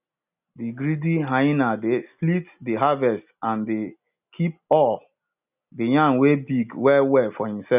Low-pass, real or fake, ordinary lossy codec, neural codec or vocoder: 3.6 kHz; real; none; none